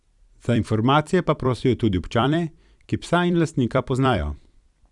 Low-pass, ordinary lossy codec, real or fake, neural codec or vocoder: 10.8 kHz; none; fake; vocoder, 44.1 kHz, 128 mel bands every 256 samples, BigVGAN v2